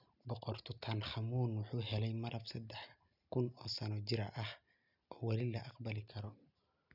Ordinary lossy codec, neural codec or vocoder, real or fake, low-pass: none; none; real; 5.4 kHz